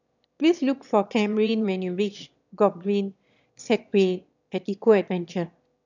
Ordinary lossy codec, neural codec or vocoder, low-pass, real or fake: none; autoencoder, 22.05 kHz, a latent of 192 numbers a frame, VITS, trained on one speaker; 7.2 kHz; fake